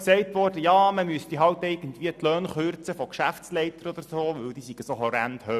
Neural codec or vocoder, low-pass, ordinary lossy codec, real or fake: none; 14.4 kHz; none; real